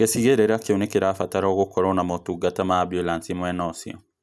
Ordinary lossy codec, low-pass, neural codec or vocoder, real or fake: none; none; none; real